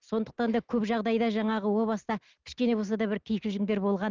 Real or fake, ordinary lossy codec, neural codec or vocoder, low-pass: real; Opus, 32 kbps; none; 7.2 kHz